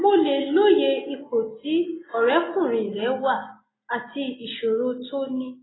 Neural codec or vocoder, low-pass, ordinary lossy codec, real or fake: none; 7.2 kHz; AAC, 16 kbps; real